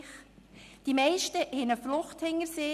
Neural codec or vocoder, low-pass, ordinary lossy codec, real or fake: none; 14.4 kHz; none; real